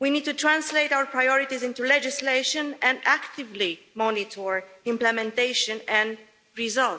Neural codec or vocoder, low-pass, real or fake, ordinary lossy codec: none; none; real; none